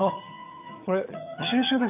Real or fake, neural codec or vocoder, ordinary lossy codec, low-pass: fake; vocoder, 22.05 kHz, 80 mel bands, HiFi-GAN; none; 3.6 kHz